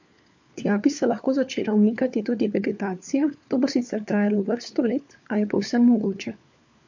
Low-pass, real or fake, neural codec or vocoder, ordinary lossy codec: 7.2 kHz; fake; codec, 16 kHz, 4 kbps, FunCodec, trained on LibriTTS, 50 frames a second; MP3, 48 kbps